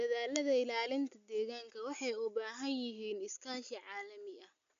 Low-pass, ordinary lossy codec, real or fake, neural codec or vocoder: 7.2 kHz; MP3, 64 kbps; real; none